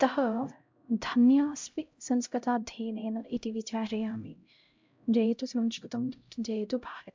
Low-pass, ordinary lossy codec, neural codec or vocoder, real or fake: 7.2 kHz; MP3, 64 kbps; codec, 16 kHz, 0.5 kbps, X-Codec, HuBERT features, trained on LibriSpeech; fake